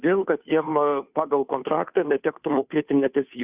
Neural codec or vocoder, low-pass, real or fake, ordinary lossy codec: codec, 16 kHz, 2 kbps, FunCodec, trained on Chinese and English, 25 frames a second; 3.6 kHz; fake; Opus, 64 kbps